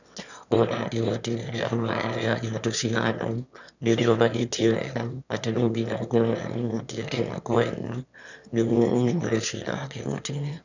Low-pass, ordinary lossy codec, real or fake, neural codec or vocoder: 7.2 kHz; none; fake; autoencoder, 22.05 kHz, a latent of 192 numbers a frame, VITS, trained on one speaker